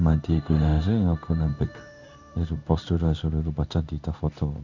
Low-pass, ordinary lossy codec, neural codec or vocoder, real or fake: 7.2 kHz; none; codec, 16 kHz in and 24 kHz out, 1 kbps, XY-Tokenizer; fake